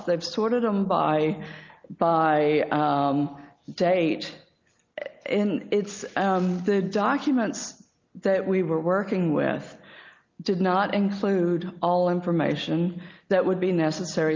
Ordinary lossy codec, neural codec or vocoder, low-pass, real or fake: Opus, 32 kbps; none; 7.2 kHz; real